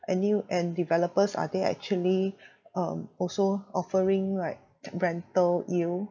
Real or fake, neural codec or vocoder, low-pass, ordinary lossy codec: real; none; 7.2 kHz; none